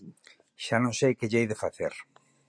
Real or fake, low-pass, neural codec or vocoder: real; 9.9 kHz; none